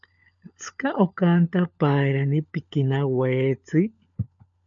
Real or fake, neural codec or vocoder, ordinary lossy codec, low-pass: fake; codec, 16 kHz, 16 kbps, FunCodec, trained on LibriTTS, 50 frames a second; MP3, 96 kbps; 7.2 kHz